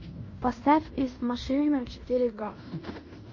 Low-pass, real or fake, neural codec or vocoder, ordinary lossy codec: 7.2 kHz; fake; codec, 16 kHz in and 24 kHz out, 0.9 kbps, LongCat-Audio-Codec, fine tuned four codebook decoder; MP3, 32 kbps